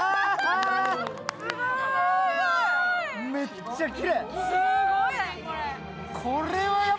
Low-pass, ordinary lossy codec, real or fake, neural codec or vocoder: none; none; real; none